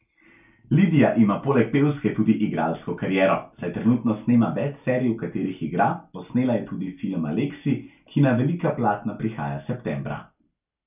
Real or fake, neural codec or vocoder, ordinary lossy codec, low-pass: real; none; none; 3.6 kHz